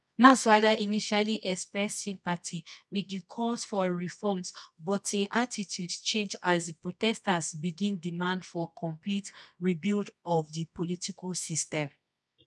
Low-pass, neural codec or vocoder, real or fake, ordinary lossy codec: none; codec, 24 kHz, 0.9 kbps, WavTokenizer, medium music audio release; fake; none